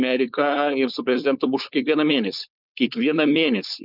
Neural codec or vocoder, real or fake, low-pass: codec, 16 kHz, 4.8 kbps, FACodec; fake; 5.4 kHz